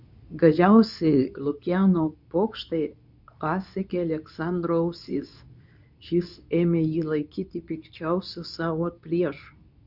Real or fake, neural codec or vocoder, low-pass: fake; codec, 24 kHz, 0.9 kbps, WavTokenizer, medium speech release version 2; 5.4 kHz